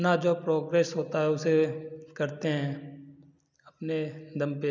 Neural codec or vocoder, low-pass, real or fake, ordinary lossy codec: none; 7.2 kHz; real; none